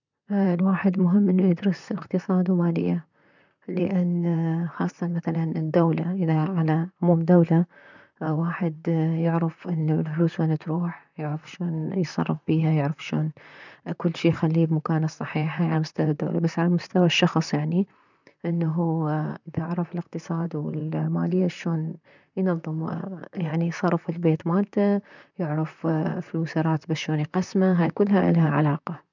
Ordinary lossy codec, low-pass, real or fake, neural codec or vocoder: none; 7.2 kHz; fake; vocoder, 44.1 kHz, 128 mel bands, Pupu-Vocoder